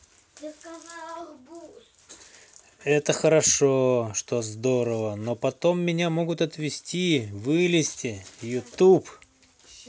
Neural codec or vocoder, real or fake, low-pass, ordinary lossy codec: none; real; none; none